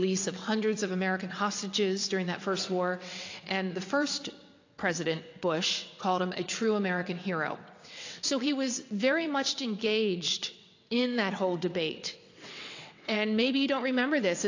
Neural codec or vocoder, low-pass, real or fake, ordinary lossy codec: vocoder, 22.05 kHz, 80 mel bands, WaveNeXt; 7.2 kHz; fake; AAC, 48 kbps